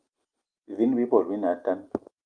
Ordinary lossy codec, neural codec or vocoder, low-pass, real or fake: Opus, 32 kbps; none; 9.9 kHz; real